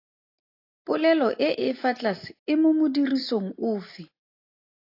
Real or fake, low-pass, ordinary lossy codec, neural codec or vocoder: real; 5.4 kHz; AAC, 32 kbps; none